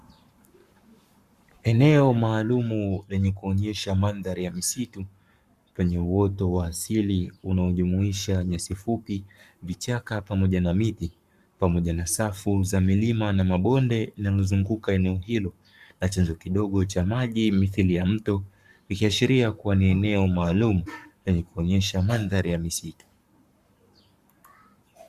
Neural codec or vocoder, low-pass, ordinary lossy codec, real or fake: codec, 44.1 kHz, 7.8 kbps, Pupu-Codec; 14.4 kHz; Opus, 64 kbps; fake